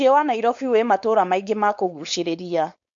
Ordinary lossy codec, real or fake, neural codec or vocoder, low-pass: MP3, 48 kbps; fake; codec, 16 kHz, 4.8 kbps, FACodec; 7.2 kHz